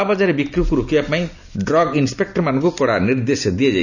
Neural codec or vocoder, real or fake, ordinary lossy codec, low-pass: none; real; none; 7.2 kHz